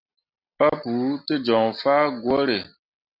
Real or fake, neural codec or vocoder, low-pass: real; none; 5.4 kHz